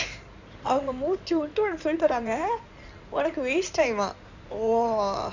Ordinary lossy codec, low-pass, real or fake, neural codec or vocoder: none; 7.2 kHz; fake; codec, 16 kHz in and 24 kHz out, 2.2 kbps, FireRedTTS-2 codec